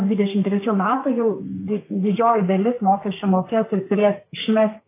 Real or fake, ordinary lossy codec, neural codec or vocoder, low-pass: fake; AAC, 24 kbps; codec, 32 kHz, 1.9 kbps, SNAC; 3.6 kHz